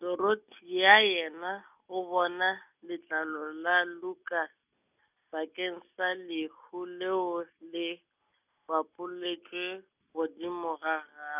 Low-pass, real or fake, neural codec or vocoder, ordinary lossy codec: 3.6 kHz; real; none; none